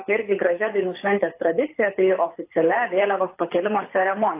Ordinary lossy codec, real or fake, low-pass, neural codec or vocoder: AAC, 24 kbps; fake; 3.6 kHz; codec, 24 kHz, 6 kbps, HILCodec